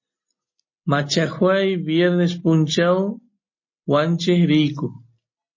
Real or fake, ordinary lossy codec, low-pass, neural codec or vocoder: real; MP3, 32 kbps; 7.2 kHz; none